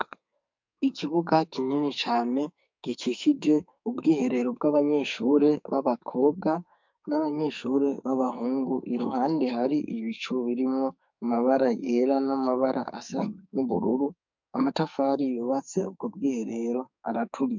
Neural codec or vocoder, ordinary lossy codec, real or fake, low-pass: codec, 44.1 kHz, 2.6 kbps, SNAC; MP3, 64 kbps; fake; 7.2 kHz